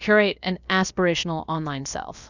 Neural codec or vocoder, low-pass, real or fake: codec, 16 kHz, about 1 kbps, DyCAST, with the encoder's durations; 7.2 kHz; fake